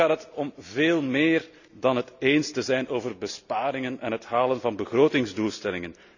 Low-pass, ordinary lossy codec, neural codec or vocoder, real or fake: 7.2 kHz; none; none; real